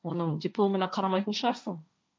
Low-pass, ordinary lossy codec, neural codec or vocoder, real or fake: none; none; codec, 16 kHz, 1.1 kbps, Voila-Tokenizer; fake